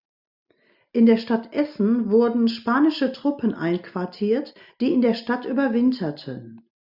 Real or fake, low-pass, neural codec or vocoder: real; 5.4 kHz; none